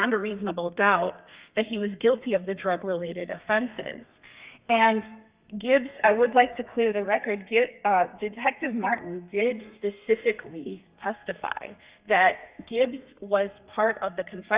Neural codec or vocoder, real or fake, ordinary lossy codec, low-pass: codec, 32 kHz, 1.9 kbps, SNAC; fake; Opus, 64 kbps; 3.6 kHz